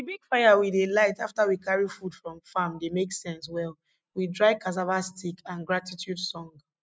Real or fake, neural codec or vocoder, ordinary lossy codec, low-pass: real; none; none; none